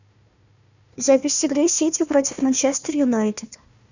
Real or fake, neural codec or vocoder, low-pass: fake; codec, 16 kHz, 1 kbps, FunCodec, trained on Chinese and English, 50 frames a second; 7.2 kHz